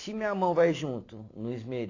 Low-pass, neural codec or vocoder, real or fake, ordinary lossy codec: 7.2 kHz; vocoder, 44.1 kHz, 128 mel bands every 256 samples, BigVGAN v2; fake; AAC, 32 kbps